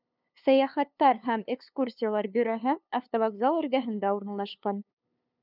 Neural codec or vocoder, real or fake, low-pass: codec, 16 kHz, 2 kbps, FunCodec, trained on LibriTTS, 25 frames a second; fake; 5.4 kHz